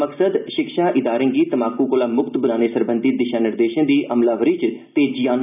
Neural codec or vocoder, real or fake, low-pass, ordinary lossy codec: none; real; 3.6 kHz; none